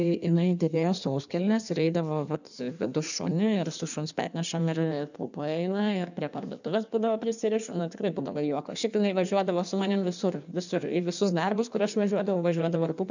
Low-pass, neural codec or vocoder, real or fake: 7.2 kHz; codec, 16 kHz in and 24 kHz out, 1.1 kbps, FireRedTTS-2 codec; fake